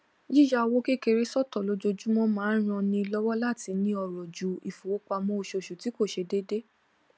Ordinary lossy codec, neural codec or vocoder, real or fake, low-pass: none; none; real; none